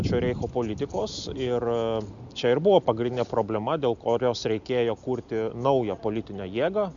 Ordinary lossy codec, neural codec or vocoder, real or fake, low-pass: MP3, 96 kbps; none; real; 7.2 kHz